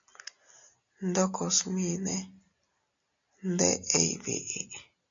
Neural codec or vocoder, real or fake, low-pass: none; real; 7.2 kHz